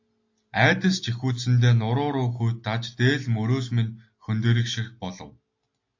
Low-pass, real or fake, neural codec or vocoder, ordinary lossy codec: 7.2 kHz; real; none; AAC, 48 kbps